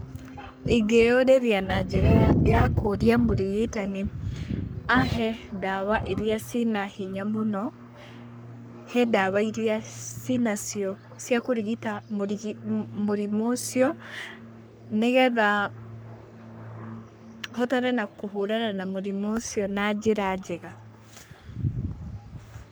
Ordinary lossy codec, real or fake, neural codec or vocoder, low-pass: none; fake; codec, 44.1 kHz, 3.4 kbps, Pupu-Codec; none